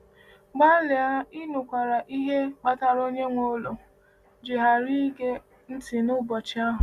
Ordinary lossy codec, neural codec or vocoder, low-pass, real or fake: Opus, 64 kbps; none; 14.4 kHz; real